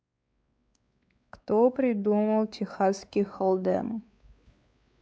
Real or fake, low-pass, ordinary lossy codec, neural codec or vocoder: fake; none; none; codec, 16 kHz, 4 kbps, X-Codec, WavLM features, trained on Multilingual LibriSpeech